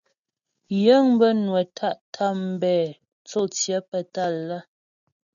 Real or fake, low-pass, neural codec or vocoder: real; 7.2 kHz; none